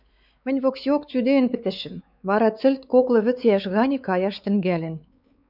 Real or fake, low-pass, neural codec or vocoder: fake; 5.4 kHz; codec, 16 kHz, 4 kbps, X-Codec, WavLM features, trained on Multilingual LibriSpeech